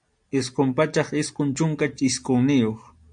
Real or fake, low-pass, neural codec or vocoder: real; 9.9 kHz; none